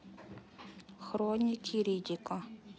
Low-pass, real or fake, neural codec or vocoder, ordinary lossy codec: none; real; none; none